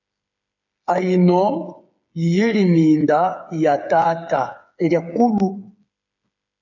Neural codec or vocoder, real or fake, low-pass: codec, 16 kHz, 8 kbps, FreqCodec, smaller model; fake; 7.2 kHz